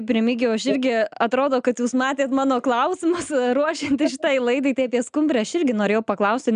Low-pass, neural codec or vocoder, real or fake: 9.9 kHz; none; real